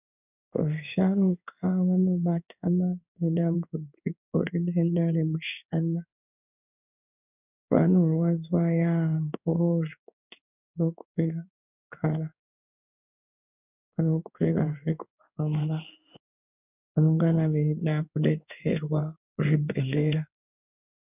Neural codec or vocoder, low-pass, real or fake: codec, 16 kHz in and 24 kHz out, 1 kbps, XY-Tokenizer; 3.6 kHz; fake